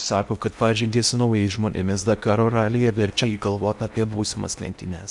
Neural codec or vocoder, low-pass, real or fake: codec, 16 kHz in and 24 kHz out, 0.8 kbps, FocalCodec, streaming, 65536 codes; 10.8 kHz; fake